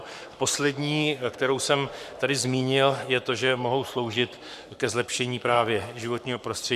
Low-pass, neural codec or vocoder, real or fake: 14.4 kHz; codec, 44.1 kHz, 7.8 kbps, Pupu-Codec; fake